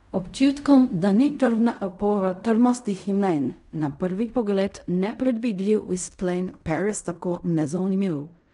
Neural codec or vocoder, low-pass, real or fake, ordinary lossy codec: codec, 16 kHz in and 24 kHz out, 0.4 kbps, LongCat-Audio-Codec, fine tuned four codebook decoder; 10.8 kHz; fake; none